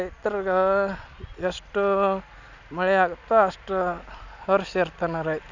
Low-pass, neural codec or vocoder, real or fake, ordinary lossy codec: 7.2 kHz; none; real; none